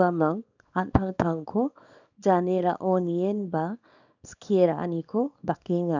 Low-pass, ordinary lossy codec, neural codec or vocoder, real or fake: 7.2 kHz; none; codec, 24 kHz, 0.9 kbps, WavTokenizer, small release; fake